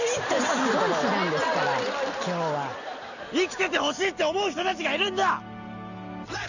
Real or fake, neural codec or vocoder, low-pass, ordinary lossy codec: real; none; 7.2 kHz; none